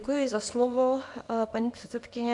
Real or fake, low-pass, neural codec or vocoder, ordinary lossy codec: fake; 10.8 kHz; codec, 24 kHz, 0.9 kbps, WavTokenizer, small release; AAC, 64 kbps